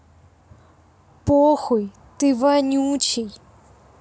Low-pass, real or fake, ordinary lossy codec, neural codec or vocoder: none; real; none; none